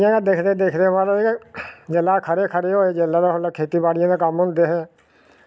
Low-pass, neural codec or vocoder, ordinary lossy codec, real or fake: none; none; none; real